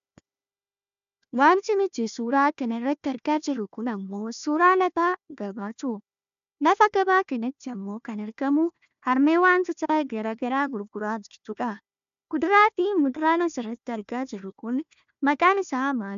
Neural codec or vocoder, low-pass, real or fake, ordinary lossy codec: codec, 16 kHz, 1 kbps, FunCodec, trained on Chinese and English, 50 frames a second; 7.2 kHz; fake; AAC, 96 kbps